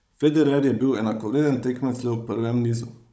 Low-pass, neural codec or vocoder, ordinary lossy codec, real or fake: none; codec, 16 kHz, 16 kbps, FunCodec, trained on Chinese and English, 50 frames a second; none; fake